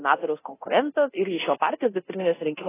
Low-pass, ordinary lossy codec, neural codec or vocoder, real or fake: 3.6 kHz; AAC, 16 kbps; codec, 24 kHz, 0.9 kbps, DualCodec; fake